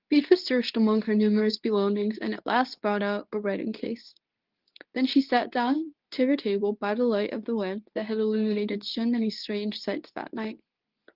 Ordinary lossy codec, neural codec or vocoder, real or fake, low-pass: Opus, 32 kbps; codec, 24 kHz, 0.9 kbps, WavTokenizer, medium speech release version 2; fake; 5.4 kHz